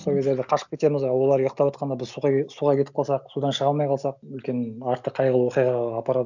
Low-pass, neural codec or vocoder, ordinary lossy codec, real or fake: 7.2 kHz; none; none; real